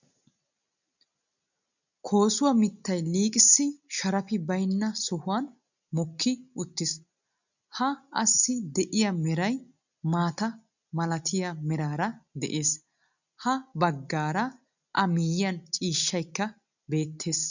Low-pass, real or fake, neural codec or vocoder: 7.2 kHz; real; none